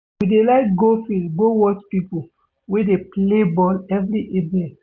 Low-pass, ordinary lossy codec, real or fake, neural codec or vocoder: none; none; real; none